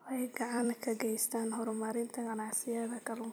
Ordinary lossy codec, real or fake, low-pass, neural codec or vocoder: none; fake; none; vocoder, 44.1 kHz, 128 mel bands every 256 samples, BigVGAN v2